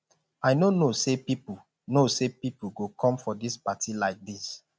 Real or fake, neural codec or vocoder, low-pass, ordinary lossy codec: real; none; none; none